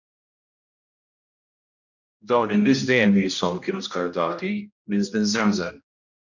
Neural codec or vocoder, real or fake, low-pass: codec, 16 kHz, 1 kbps, X-Codec, HuBERT features, trained on general audio; fake; 7.2 kHz